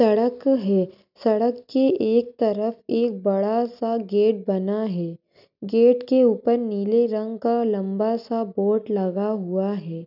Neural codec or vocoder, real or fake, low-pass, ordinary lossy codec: none; real; 5.4 kHz; none